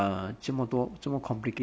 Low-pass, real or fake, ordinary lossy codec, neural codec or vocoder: none; real; none; none